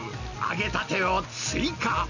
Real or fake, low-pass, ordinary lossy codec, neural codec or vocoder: real; 7.2 kHz; none; none